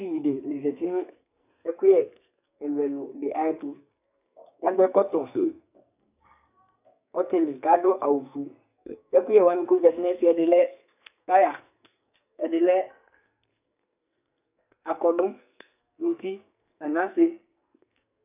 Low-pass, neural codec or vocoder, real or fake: 3.6 kHz; codec, 44.1 kHz, 2.6 kbps, SNAC; fake